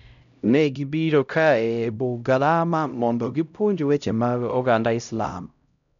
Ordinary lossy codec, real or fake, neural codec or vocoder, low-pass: none; fake; codec, 16 kHz, 0.5 kbps, X-Codec, HuBERT features, trained on LibriSpeech; 7.2 kHz